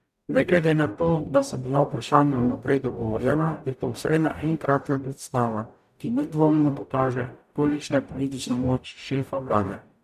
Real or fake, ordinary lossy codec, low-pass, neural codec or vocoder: fake; none; 14.4 kHz; codec, 44.1 kHz, 0.9 kbps, DAC